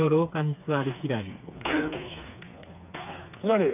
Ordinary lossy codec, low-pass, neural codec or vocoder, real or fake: none; 3.6 kHz; codec, 16 kHz, 4 kbps, FreqCodec, smaller model; fake